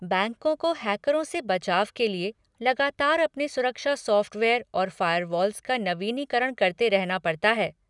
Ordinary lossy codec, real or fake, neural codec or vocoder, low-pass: none; real; none; 10.8 kHz